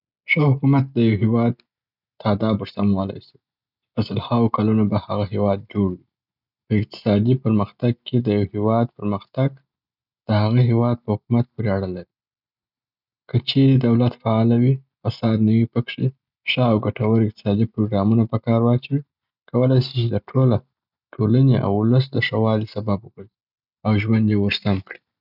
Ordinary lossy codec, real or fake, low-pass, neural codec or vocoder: none; real; 5.4 kHz; none